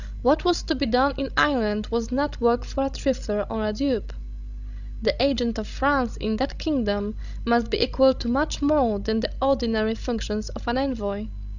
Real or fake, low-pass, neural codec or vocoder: fake; 7.2 kHz; codec, 16 kHz, 16 kbps, FreqCodec, larger model